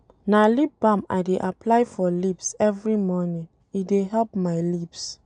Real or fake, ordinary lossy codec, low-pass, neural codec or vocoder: real; none; 9.9 kHz; none